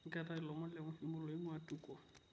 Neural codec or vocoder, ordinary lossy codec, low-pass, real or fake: none; none; none; real